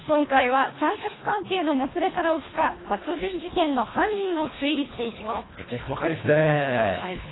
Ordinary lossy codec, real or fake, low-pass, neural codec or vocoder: AAC, 16 kbps; fake; 7.2 kHz; codec, 24 kHz, 1.5 kbps, HILCodec